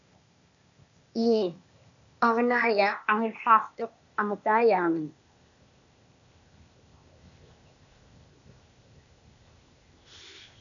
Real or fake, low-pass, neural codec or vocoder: fake; 7.2 kHz; codec, 16 kHz, 0.8 kbps, ZipCodec